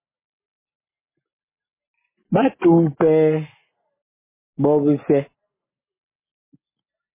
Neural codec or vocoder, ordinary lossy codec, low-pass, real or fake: none; MP3, 16 kbps; 3.6 kHz; real